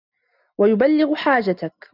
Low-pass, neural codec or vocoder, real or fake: 5.4 kHz; none; real